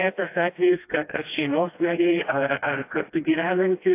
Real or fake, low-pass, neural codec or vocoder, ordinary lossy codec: fake; 3.6 kHz; codec, 16 kHz, 1 kbps, FreqCodec, smaller model; AAC, 24 kbps